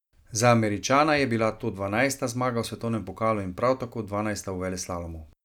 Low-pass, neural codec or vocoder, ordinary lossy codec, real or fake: 19.8 kHz; none; none; real